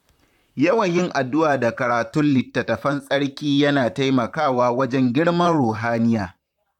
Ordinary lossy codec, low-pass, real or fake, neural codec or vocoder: none; 19.8 kHz; fake; vocoder, 44.1 kHz, 128 mel bands, Pupu-Vocoder